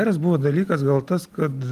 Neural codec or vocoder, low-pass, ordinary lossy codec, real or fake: none; 14.4 kHz; Opus, 32 kbps; real